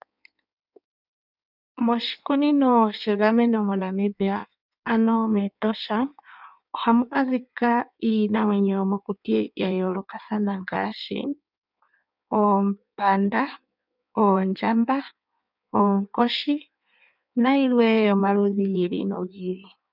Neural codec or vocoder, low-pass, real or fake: codec, 16 kHz in and 24 kHz out, 1.1 kbps, FireRedTTS-2 codec; 5.4 kHz; fake